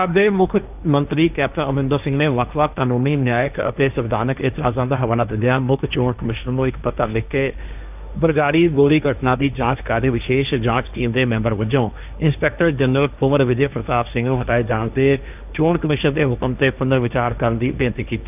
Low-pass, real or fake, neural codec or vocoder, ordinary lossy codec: 3.6 kHz; fake; codec, 16 kHz, 1.1 kbps, Voila-Tokenizer; none